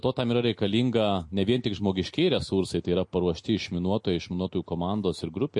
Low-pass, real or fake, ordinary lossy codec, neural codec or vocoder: 10.8 kHz; real; MP3, 48 kbps; none